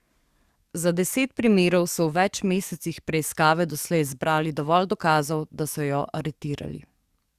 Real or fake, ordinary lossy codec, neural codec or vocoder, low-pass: fake; Opus, 64 kbps; codec, 44.1 kHz, 7.8 kbps, DAC; 14.4 kHz